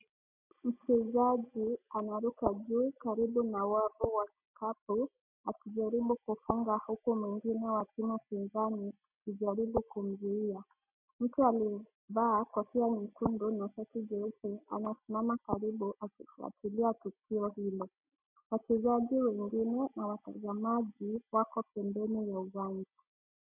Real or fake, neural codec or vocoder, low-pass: real; none; 3.6 kHz